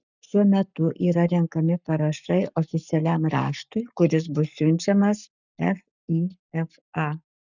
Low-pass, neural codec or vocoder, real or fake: 7.2 kHz; codec, 44.1 kHz, 7.8 kbps, Pupu-Codec; fake